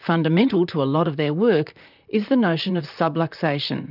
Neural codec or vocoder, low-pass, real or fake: vocoder, 44.1 kHz, 128 mel bands, Pupu-Vocoder; 5.4 kHz; fake